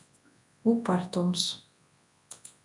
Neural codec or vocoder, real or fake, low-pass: codec, 24 kHz, 0.9 kbps, WavTokenizer, large speech release; fake; 10.8 kHz